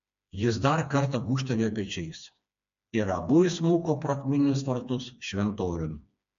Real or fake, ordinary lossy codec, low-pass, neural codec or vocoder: fake; AAC, 64 kbps; 7.2 kHz; codec, 16 kHz, 2 kbps, FreqCodec, smaller model